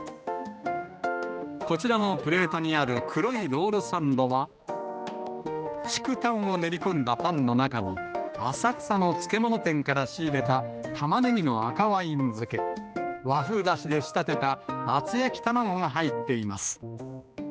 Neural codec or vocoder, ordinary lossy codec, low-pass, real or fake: codec, 16 kHz, 2 kbps, X-Codec, HuBERT features, trained on general audio; none; none; fake